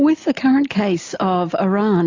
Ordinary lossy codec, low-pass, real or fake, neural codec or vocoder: AAC, 48 kbps; 7.2 kHz; real; none